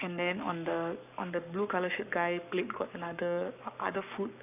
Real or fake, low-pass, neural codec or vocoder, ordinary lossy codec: fake; 3.6 kHz; codec, 44.1 kHz, 7.8 kbps, Pupu-Codec; none